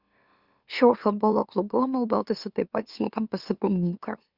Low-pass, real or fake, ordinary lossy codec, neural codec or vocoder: 5.4 kHz; fake; Opus, 64 kbps; autoencoder, 44.1 kHz, a latent of 192 numbers a frame, MeloTTS